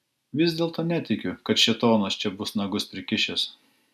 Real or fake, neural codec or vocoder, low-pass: real; none; 14.4 kHz